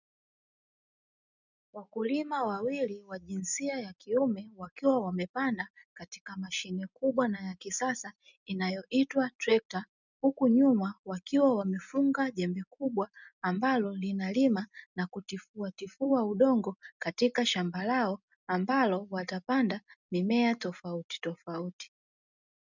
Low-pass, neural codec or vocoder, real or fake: 7.2 kHz; none; real